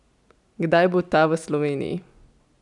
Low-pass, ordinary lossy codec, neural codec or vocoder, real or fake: 10.8 kHz; none; none; real